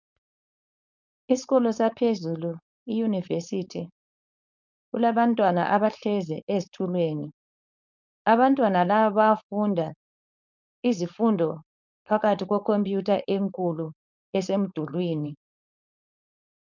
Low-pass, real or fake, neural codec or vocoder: 7.2 kHz; fake; codec, 16 kHz, 4.8 kbps, FACodec